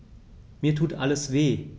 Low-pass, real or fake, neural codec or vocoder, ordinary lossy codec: none; real; none; none